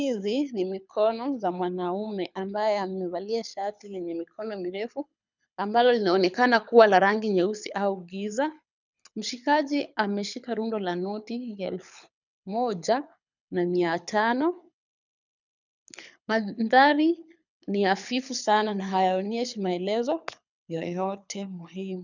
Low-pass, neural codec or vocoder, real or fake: 7.2 kHz; codec, 24 kHz, 6 kbps, HILCodec; fake